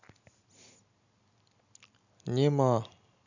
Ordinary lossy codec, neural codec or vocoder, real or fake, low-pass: none; none; real; 7.2 kHz